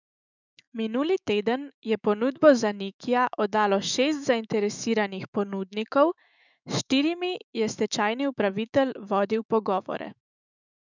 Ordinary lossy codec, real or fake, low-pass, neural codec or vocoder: none; fake; 7.2 kHz; autoencoder, 48 kHz, 128 numbers a frame, DAC-VAE, trained on Japanese speech